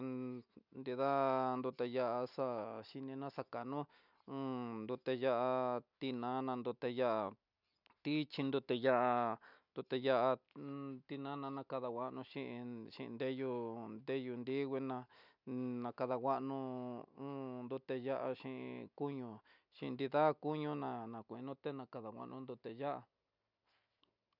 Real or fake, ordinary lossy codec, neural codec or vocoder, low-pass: real; none; none; 5.4 kHz